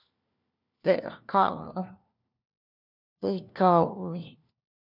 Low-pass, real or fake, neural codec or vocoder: 5.4 kHz; fake; codec, 16 kHz, 1 kbps, FunCodec, trained on LibriTTS, 50 frames a second